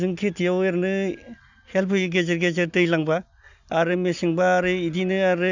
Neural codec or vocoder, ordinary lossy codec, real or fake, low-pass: none; none; real; 7.2 kHz